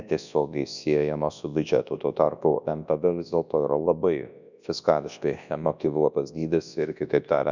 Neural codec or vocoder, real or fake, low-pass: codec, 24 kHz, 0.9 kbps, WavTokenizer, large speech release; fake; 7.2 kHz